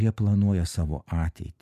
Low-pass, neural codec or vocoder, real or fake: 14.4 kHz; none; real